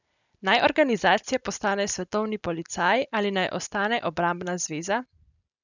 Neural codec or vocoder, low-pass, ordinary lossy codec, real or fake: none; 7.2 kHz; none; real